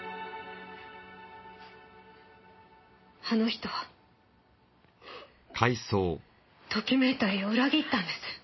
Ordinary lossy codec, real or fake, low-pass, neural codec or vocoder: MP3, 24 kbps; real; 7.2 kHz; none